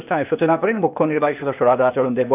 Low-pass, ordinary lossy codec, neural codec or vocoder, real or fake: 3.6 kHz; none; codec, 16 kHz, 0.8 kbps, ZipCodec; fake